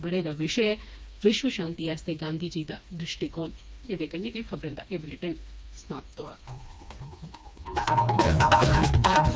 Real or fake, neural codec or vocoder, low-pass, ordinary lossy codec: fake; codec, 16 kHz, 2 kbps, FreqCodec, smaller model; none; none